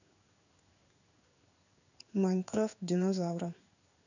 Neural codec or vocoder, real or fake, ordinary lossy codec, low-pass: codec, 16 kHz in and 24 kHz out, 1 kbps, XY-Tokenizer; fake; none; 7.2 kHz